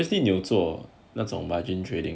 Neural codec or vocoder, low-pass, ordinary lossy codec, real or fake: none; none; none; real